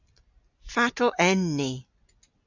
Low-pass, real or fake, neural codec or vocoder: 7.2 kHz; real; none